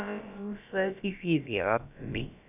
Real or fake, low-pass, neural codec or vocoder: fake; 3.6 kHz; codec, 16 kHz, about 1 kbps, DyCAST, with the encoder's durations